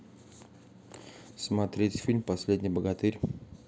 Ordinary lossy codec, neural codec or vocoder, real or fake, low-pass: none; none; real; none